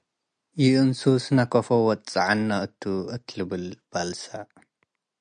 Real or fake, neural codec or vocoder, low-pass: real; none; 9.9 kHz